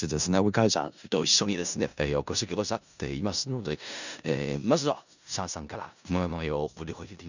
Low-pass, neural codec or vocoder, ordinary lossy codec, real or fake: 7.2 kHz; codec, 16 kHz in and 24 kHz out, 0.4 kbps, LongCat-Audio-Codec, four codebook decoder; none; fake